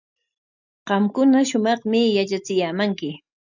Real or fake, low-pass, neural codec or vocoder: real; 7.2 kHz; none